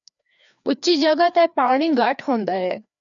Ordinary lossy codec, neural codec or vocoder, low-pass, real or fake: MP3, 96 kbps; codec, 16 kHz, 2 kbps, FreqCodec, larger model; 7.2 kHz; fake